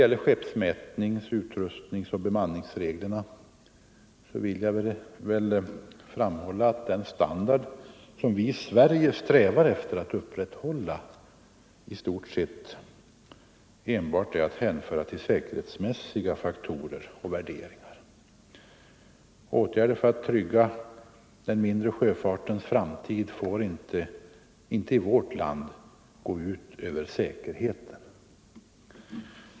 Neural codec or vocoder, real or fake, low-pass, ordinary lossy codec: none; real; none; none